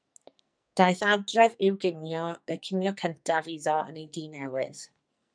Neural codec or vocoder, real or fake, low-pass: codec, 44.1 kHz, 2.6 kbps, SNAC; fake; 9.9 kHz